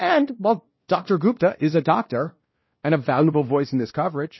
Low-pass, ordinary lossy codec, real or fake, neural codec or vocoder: 7.2 kHz; MP3, 24 kbps; fake; codec, 16 kHz, 1 kbps, X-Codec, WavLM features, trained on Multilingual LibriSpeech